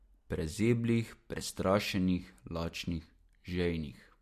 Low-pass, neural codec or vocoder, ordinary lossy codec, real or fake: 14.4 kHz; none; MP3, 64 kbps; real